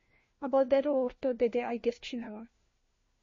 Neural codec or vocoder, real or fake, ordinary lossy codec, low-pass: codec, 16 kHz, 1 kbps, FunCodec, trained on LibriTTS, 50 frames a second; fake; MP3, 32 kbps; 7.2 kHz